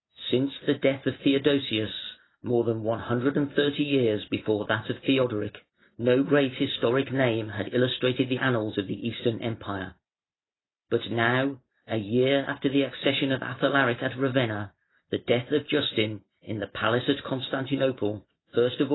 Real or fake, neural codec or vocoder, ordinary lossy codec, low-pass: real; none; AAC, 16 kbps; 7.2 kHz